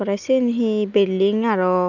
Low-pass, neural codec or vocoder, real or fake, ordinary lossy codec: 7.2 kHz; none; real; none